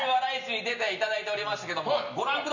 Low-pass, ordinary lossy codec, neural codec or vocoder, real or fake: 7.2 kHz; none; none; real